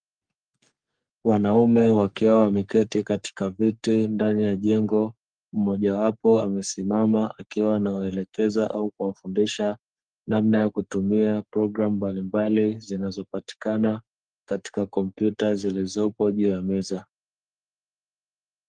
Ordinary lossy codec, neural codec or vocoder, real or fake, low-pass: Opus, 24 kbps; codec, 44.1 kHz, 3.4 kbps, Pupu-Codec; fake; 9.9 kHz